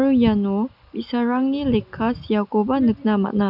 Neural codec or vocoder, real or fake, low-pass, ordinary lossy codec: none; real; 5.4 kHz; none